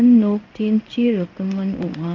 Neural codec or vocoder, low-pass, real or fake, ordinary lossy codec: codec, 16 kHz in and 24 kHz out, 1 kbps, XY-Tokenizer; 7.2 kHz; fake; Opus, 24 kbps